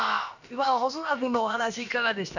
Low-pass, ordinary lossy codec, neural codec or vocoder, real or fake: 7.2 kHz; none; codec, 16 kHz, about 1 kbps, DyCAST, with the encoder's durations; fake